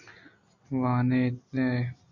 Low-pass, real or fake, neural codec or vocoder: 7.2 kHz; real; none